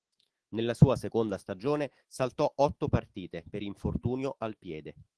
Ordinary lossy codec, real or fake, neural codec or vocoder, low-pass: Opus, 16 kbps; fake; autoencoder, 48 kHz, 128 numbers a frame, DAC-VAE, trained on Japanese speech; 10.8 kHz